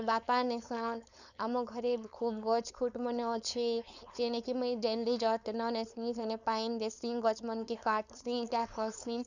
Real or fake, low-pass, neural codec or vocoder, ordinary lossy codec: fake; 7.2 kHz; codec, 16 kHz, 4.8 kbps, FACodec; none